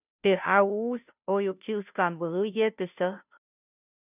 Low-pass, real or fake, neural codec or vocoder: 3.6 kHz; fake; codec, 16 kHz, 0.5 kbps, FunCodec, trained on Chinese and English, 25 frames a second